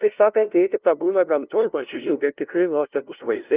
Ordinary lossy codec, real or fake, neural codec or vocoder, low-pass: Opus, 32 kbps; fake; codec, 16 kHz, 0.5 kbps, FunCodec, trained on LibriTTS, 25 frames a second; 3.6 kHz